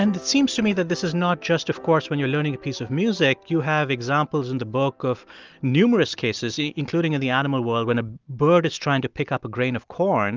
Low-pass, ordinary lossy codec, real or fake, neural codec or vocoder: 7.2 kHz; Opus, 24 kbps; real; none